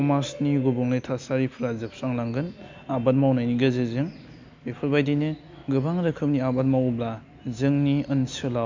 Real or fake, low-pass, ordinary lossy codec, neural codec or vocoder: real; 7.2 kHz; MP3, 64 kbps; none